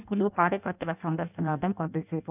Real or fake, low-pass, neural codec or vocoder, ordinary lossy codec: fake; 3.6 kHz; codec, 16 kHz in and 24 kHz out, 0.6 kbps, FireRedTTS-2 codec; none